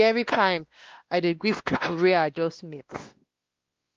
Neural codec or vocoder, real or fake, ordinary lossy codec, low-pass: codec, 16 kHz, 1 kbps, X-Codec, WavLM features, trained on Multilingual LibriSpeech; fake; Opus, 32 kbps; 7.2 kHz